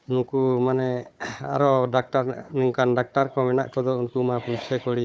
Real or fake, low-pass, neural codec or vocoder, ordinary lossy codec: fake; none; codec, 16 kHz, 4 kbps, FunCodec, trained on Chinese and English, 50 frames a second; none